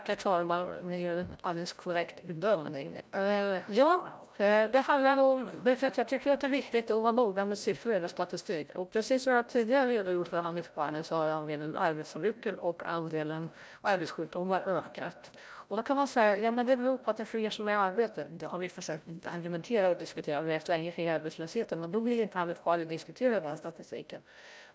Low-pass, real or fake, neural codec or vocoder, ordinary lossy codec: none; fake; codec, 16 kHz, 0.5 kbps, FreqCodec, larger model; none